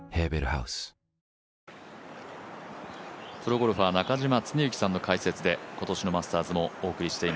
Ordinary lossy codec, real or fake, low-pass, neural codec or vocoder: none; real; none; none